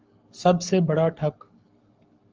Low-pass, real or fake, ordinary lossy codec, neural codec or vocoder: 7.2 kHz; fake; Opus, 24 kbps; codec, 44.1 kHz, 7.8 kbps, Pupu-Codec